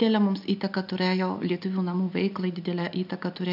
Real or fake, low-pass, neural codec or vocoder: real; 5.4 kHz; none